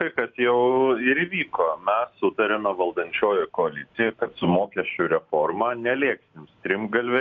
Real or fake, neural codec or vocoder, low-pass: real; none; 7.2 kHz